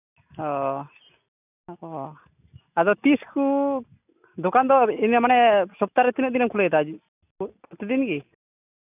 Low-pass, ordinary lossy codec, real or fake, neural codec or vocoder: 3.6 kHz; none; real; none